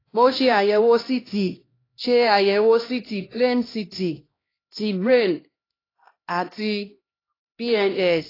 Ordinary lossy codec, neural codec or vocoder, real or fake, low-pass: AAC, 24 kbps; codec, 16 kHz, 1 kbps, X-Codec, HuBERT features, trained on LibriSpeech; fake; 5.4 kHz